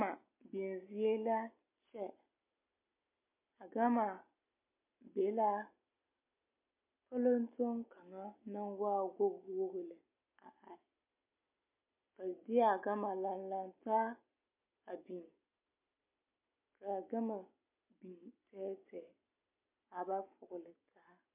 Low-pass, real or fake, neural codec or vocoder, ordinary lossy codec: 3.6 kHz; real; none; MP3, 24 kbps